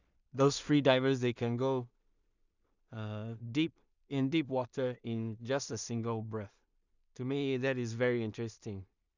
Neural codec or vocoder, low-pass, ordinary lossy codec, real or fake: codec, 16 kHz in and 24 kHz out, 0.4 kbps, LongCat-Audio-Codec, two codebook decoder; 7.2 kHz; none; fake